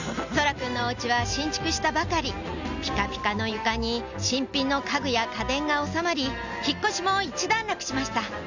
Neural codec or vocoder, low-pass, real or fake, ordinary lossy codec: none; 7.2 kHz; real; none